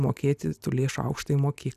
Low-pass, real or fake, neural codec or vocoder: 14.4 kHz; real; none